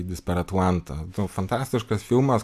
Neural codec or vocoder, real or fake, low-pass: none; real; 14.4 kHz